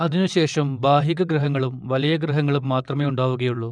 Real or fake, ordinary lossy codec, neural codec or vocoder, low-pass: fake; none; vocoder, 22.05 kHz, 80 mel bands, WaveNeXt; 9.9 kHz